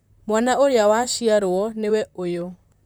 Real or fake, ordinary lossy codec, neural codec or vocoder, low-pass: fake; none; vocoder, 44.1 kHz, 128 mel bands, Pupu-Vocoder; none